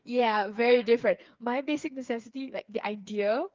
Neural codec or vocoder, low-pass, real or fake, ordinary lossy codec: codec, 16 kHz, 4 kbps, FreqCodec, smaller model; 7.2 kHz; fake; Opus, 24 kbps